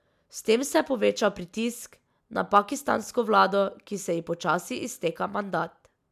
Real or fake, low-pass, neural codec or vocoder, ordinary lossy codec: real; 14.4 kHz; none; MP3, 96 kbps